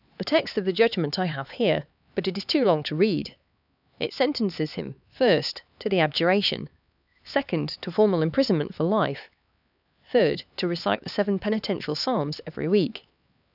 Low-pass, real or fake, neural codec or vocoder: 5.4 kHz; fake; codec, 16 kHz, 4 kbps, X-Codec, HuBERT features, trained on LibriSpeech